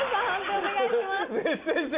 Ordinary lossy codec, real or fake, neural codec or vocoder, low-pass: Opus, 64 kbps; real; none; 3.6 kHz